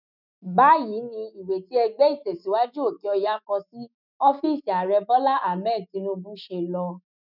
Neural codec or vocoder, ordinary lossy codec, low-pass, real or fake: autoencoder, 48 kHz, 128 numbers a frame, DAC-VAE, trained on Japanese speech; none; 5.4 kHz; fake